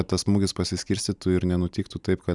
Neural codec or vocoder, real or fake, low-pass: vocoder, 44.1 kHz, 128 mel bands every 256 samples, BigVGAN v2; fake; 10.8 kHz